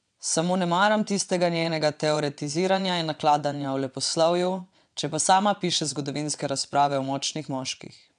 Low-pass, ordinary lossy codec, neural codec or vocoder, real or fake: 9.9 kHz; none; vocoder, 22.05 kHz, 80 mel bands, WaveNeXt; fake